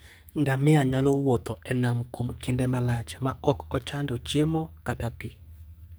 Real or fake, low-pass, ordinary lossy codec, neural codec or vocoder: fake; none; none; codec, 44.1 kHz, 2.6 kbps, SNAC